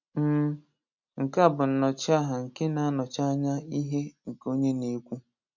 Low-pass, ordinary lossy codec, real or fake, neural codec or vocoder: 7.2 kHz; none; real; none